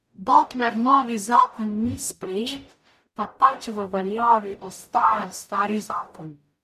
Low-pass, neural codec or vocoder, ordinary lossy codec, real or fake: 14.4 kHz; codec, 44.1 kHz, 0.9 kbps, DAC; none; fake